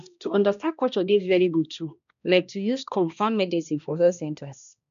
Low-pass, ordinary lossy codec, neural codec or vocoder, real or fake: 7.2 kHz; none; codec, 16 kHz, 1 kbps, X-Codec, HuBERT features, trained on balanced general audio; fake